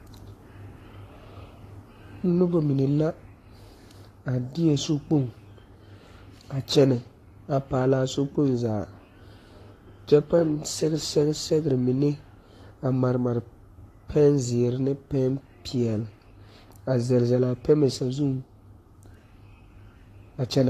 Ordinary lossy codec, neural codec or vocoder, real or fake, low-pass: AAC, 48 kbps; codec, 44.1 kHz, 7.8 kbps, Pupu-Codec; fake; 14.4 kHz